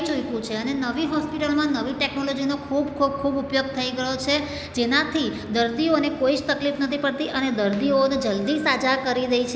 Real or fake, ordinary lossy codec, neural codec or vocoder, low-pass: real; none; none; none